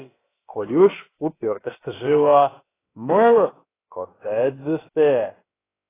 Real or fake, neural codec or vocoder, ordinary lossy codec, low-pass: fake; codec, 16 kHz, about 1 kbps, DyCAST, with the encoder's durations; AAC, 16 kbps; 3.6 kHz